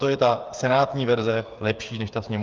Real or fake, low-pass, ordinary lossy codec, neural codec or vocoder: fake; 7.2 kHz; Opus, 32 kbps; codec, 16 kHz, 8 kbps, FreqCodec, smaller model